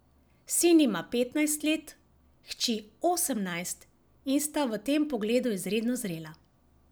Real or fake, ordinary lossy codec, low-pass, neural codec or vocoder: real; none; none; none